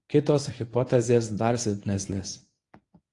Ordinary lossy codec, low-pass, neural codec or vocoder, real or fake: AAC, 48 kbps; 10.8 kHz; codec, 24 kHz, 0.9 kbps, WavTokenizer, medium speech release version 1; fake